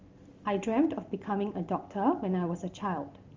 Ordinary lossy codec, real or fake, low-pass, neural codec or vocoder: Opus, 32 kbps; real; 7.2 kHz; none